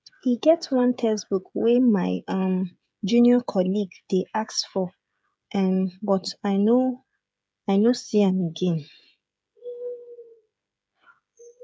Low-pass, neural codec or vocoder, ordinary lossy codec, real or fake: none; codec, 16 kHz, 16 kbps, FreqCodec, smaller model; none; fake